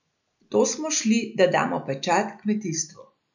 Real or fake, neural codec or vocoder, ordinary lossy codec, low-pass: real; none; none; 7.2 kHz